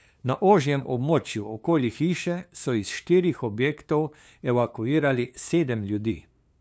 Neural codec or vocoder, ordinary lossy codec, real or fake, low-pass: codec, 16 kHz, 2 kbps, FunCodec, trained on LibriTTS, 25 frames a second; none; fake; none